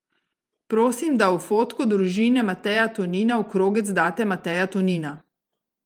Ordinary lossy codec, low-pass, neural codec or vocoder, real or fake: Opus, 32 kbps; 19.8 kHz; vocoder, 48 kHz, 128 mel bands, Vocos; fake